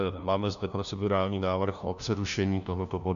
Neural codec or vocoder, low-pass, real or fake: codec, 16 kHz, 1 kbps, FunCodec, trained on LibriTTS, 50 frames a second; 7.2 kHz; fake